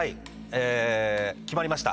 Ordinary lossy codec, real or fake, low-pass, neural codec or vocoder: none; real; none; none